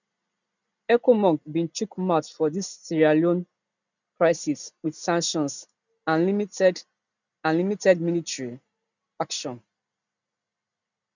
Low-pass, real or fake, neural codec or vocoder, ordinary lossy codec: 7.2 kHz; real; none; none